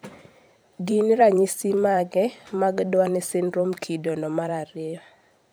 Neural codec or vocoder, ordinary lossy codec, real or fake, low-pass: vocoder, 44.1 kHz, 128 mel bands every 512 samples, BigVGAN v2; none; fake; none